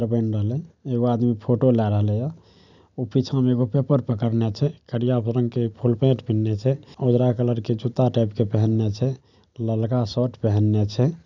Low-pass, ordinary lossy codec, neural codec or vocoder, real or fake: 7.2 kHz; none; none; real